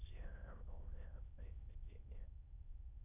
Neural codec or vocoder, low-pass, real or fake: autoencoder, 22.05 kHz, a latent of 192 numbers a frame, VITS, trained on many speakers; 3.6 kHz; fake